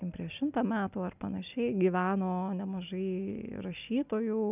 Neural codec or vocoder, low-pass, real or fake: none; 3.6 kHz; real